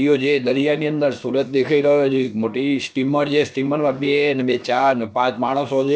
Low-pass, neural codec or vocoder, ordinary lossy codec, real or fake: none; codec, 16 kHz, about 1 kbps, DyCAST, with the encoder's durations; none; fake